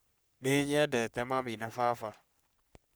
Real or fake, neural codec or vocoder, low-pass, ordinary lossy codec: fake; codec, 44.1 kHz, 3.4 kbps, Pupu-Codec; none; none